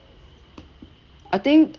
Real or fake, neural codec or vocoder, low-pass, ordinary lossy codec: real; none; 7.2 kHz; Opus, 16 kbps